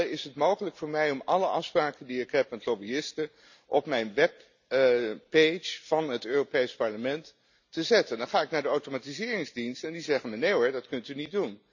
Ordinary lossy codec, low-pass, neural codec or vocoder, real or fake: none; 7.2 kHz; none; real